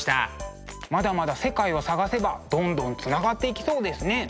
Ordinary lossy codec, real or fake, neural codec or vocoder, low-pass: none; real; none; none